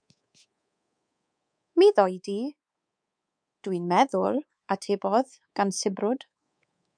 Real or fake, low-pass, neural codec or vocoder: fake; 9.9 kHz; codec, 24 kHz, 3.1 kbps, DualCodec